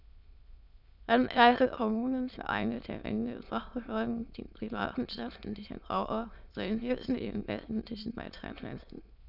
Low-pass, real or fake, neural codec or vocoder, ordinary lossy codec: 5.4 kHz; fake; autoencoder, 22.05 kHz, a latent of 192 numbers a frame, VITS, trained on many speakers; none